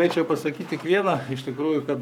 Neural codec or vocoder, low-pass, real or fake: codec, 44.1 kHz, 7.8 kbps, Pupu-Codec; 19.8 kHz; fake